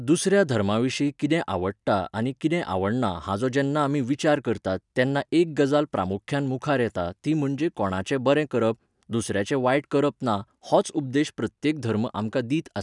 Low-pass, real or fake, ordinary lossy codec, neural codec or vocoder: 10.8 kHz; real; none; none